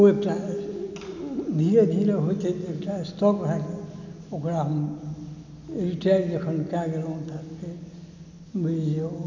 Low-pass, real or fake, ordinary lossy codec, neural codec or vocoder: 7.2 kHz; real; none; none